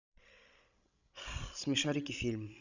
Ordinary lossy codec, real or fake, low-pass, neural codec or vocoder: none; fake; 7.2 kHz; codec, 16 kHz, 16 kbps, FreqCodec, larger model